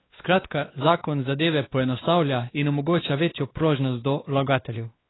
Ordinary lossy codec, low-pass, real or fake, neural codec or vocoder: AAC, 16 kbps; 7.2 kHz; fake; codec, 16 kHz, 2 kbps, X-Codec, WavLM features, trained on Multilingual LibriSpeech